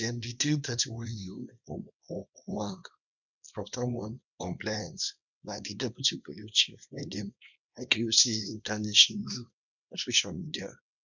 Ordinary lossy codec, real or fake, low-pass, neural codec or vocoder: none; fake; 7.2 kHz; codec, 24 kHz, 0.9 kbps, WavTokenizer, small release